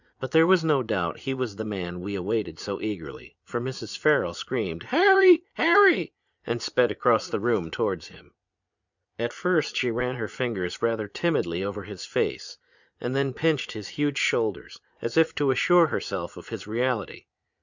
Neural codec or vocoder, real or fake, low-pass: vocoder, 44.1 kHz, 80 mel bands, Vocos; fake; 7.2 kHz